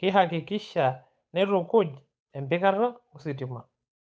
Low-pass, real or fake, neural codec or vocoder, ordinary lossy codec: none; fake; codec, 16 kHz, 8 kbps, FunCodec, trained on Chinese and English, 25 frames a second; none